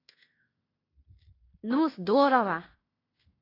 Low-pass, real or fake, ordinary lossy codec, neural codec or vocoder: 5.4 kHz; fake; AAC, 24 kbps; codec, 16 kHz in and 24 kHz out, 0.9 kbps, LongCat-Audio-Codec, fine tuned four codebook decoder